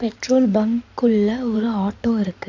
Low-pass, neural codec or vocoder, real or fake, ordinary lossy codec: 7.2 kHz; codec, 16 kHz in and 24 kHz out, 2.2 kbps, FireRedTTS-2 codec; fake; none